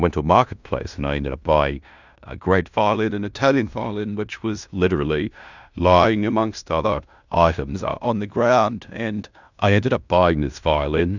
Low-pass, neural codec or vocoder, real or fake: 7.2 kHz; codec, 16 kHz in and 24 kHz out, 0.9 kbps, LongCat-Audio-Codec, fine tuned four codebook decoder; fake